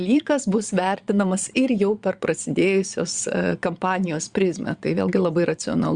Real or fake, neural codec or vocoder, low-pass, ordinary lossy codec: fake; vocoder, 22.05 kHz, 80 mel bands, Vocos; 9.9 kHz; Opus, 64 kbps